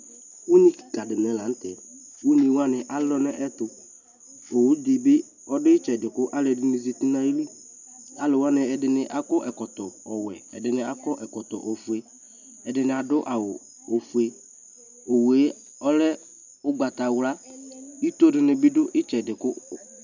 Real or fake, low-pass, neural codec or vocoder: real; 7.2 kHz; none